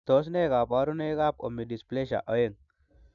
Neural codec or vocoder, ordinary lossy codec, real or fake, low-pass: none; none; real; 7.2 kHz